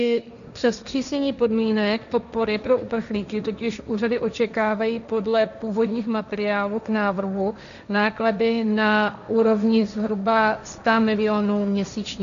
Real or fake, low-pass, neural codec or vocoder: fake; 7.2 kHz; codec, 16 kHz, 1.1 kbps, Voila-Tokenizer